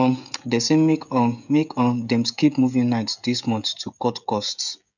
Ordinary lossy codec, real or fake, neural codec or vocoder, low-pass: none; fake; codec, 16 kHz, 16 kbps, FreqCodec, smaller model; 7.2 kHz